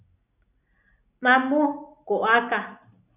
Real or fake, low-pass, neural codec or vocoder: real; 3.6 kHz; none